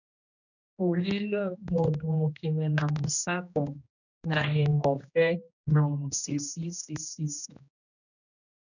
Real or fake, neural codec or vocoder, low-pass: fake; codec, 16 kHz, 1 kbps, X-Codec, HuBERT features, trained on general audio; 7.2 kHz